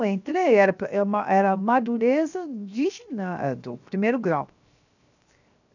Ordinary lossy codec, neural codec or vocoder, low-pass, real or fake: none; codec, 16 kHz, 0.7 kbps, FocalCodec; 7.2 kHz; fake